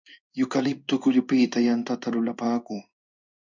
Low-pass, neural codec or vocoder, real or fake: 7.2 kHz; codec, 16 kHz in and 24 kHz out, 1 kbps, XY-Tokenizer; fake